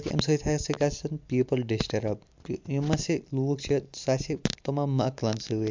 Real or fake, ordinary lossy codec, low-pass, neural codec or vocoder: real; MP3, 64 kbps; 7.2 kHz; none